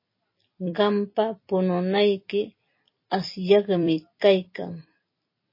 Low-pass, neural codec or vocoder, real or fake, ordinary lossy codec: 5.4 kHz; vocoder, 44.1 kHz, 128 mel bands every 256 samples, BigVGAN v2; fake; MP3, 24 kbps